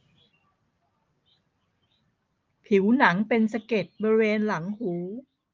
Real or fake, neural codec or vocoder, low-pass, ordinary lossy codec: real; none; 7.2 kHz; Opus, 24 kbps